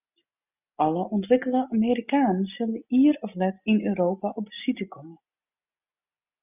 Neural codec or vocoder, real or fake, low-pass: none; real; 3.6 kHz